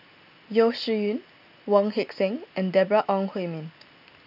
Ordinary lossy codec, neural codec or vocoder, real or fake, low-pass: none; none; real; 5.4 kHz